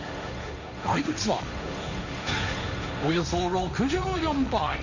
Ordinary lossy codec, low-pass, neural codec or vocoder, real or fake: none; 7.2 kHz; codec, 16 kHz, 1.1 kbps, Voila-Tokenizer; fake